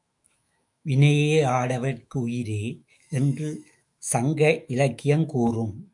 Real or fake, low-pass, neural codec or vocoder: fake; 10.8 kHz; autoencoder, 48 kHz, 128 numbers a frame, DAC-VAE, trained on Japanese speech